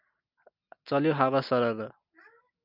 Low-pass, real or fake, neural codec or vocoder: 5.4 kHz; real; none